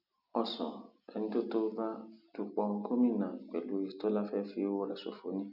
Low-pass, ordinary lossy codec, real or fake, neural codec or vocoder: 5.4 kHz; none; real; none